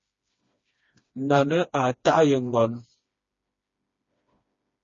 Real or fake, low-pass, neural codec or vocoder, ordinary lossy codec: fake; 7.2 kHz; codec, 16 kHz, 2 kbps, FreqCodec, smaller model; MP3, 32 kbps